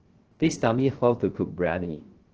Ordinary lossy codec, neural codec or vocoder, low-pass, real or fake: Opus, 16 kbps; codec, 16 kHz, 0.3 kbps, FocalCodec; 7.2 kHz; fake